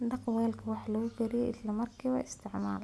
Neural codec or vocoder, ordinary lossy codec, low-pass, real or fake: none; none; none; real